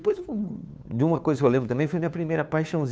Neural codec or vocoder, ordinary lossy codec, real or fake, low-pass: codec, 16 kHz, 2 kbps, FunCodec, trained on Chinese and English, 25 frames a second; none; fake; none